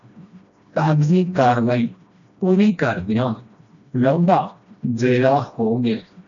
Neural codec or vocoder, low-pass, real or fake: codec, 16 kHz, 1 kbps, FreqCodec, smaller model; 7.2 kHz; fake